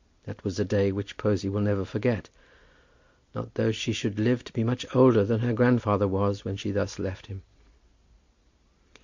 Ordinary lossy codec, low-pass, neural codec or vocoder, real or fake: Opus, 64 kbps; 7.2 kHz; none; real